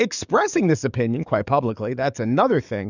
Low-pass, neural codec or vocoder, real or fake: 7.2 kHz; codec, 44.1 kHz, 7.8 kbps, DAC; fake